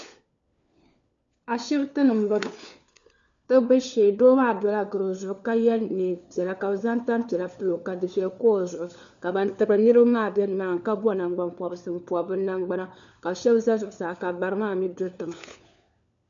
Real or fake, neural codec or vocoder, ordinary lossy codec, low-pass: fake; codec, 16 kHz, 4 kbps, FunCodec, trained on LibriTTS, 50 frames a second; MP3, 64 kbps; 7.2 kHz